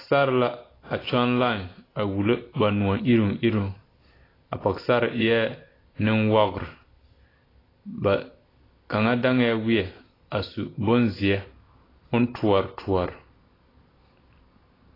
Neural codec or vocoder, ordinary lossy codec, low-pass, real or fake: none; AAC, 24 kbps; 5.4 kHz; real